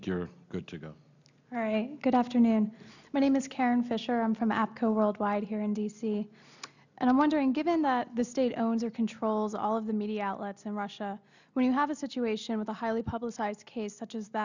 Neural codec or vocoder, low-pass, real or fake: none; 7.2 kHz; real